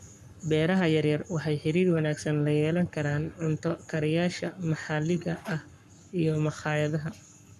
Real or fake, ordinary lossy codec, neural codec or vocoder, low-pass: fake; none; codec, 44.1 kHz, 7.8 kbps, Pupu-Codec; 14.4 kHz